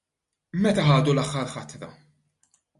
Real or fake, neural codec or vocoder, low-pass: real; none; 10.8 kHz